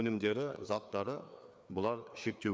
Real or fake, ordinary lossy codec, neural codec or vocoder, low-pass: fake; none; codec, 16 kHz, 4 kbps, FreqCodec, larger model; none